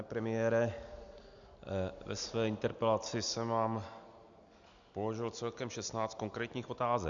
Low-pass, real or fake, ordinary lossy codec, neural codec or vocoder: 7.2 kHz; real; MP3, 96 kbps; none